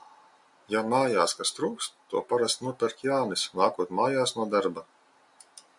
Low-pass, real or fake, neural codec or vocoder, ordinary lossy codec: 10.8 kHz; real; none; MP3, 96 kbps